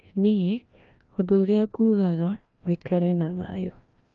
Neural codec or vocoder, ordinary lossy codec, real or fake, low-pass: codec, 16 kHz, 1 kbps, FreqCodec, larger model; Opus, 24 kbps; fake; 7.2 kHz